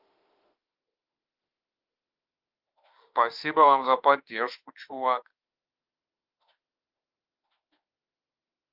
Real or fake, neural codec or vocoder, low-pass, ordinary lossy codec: fake; autoencoder, 48 kHz, 32 numbers a frame, DAC-VAE, trained on Japanese speech; 5.4 kHz; Opus, 32 kbps